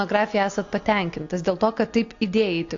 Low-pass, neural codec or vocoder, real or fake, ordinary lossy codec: 7.2 kHz; none; real; AAC, 48 kbps